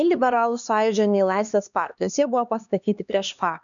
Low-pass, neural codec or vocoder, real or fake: 7.2 kHz; codec, 16 kHz, 2 kbps, X-Codec, HuBERT features, trained on LibriSpeech; fake